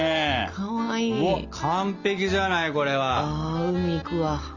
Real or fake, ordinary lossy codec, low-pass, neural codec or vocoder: real; Opus, 32 kbps; 7.2 kHz; none